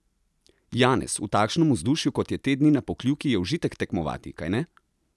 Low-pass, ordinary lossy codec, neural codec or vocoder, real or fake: none; none; none; real